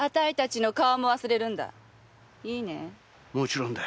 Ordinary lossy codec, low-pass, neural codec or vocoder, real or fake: none; none; none; real